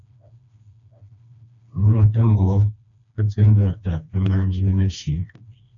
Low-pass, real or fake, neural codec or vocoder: 7.2 kHz; fake; codec, 16 kHz, 2 kbps, FreqCodec, smaller model